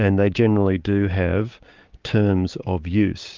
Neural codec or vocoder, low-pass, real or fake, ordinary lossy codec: codec, 16 kHz, 6 kbps, DAC; 7.2 kHz; fake; Opus, 24 kbps